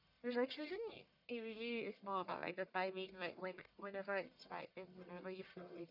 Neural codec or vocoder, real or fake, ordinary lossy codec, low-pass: codec, 44.1 kHz, 1.7 kbps, Pupu-Codec; fake; none; 5.4 kHz